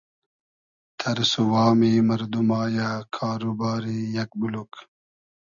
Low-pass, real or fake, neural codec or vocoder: 7.2 kHz; real; none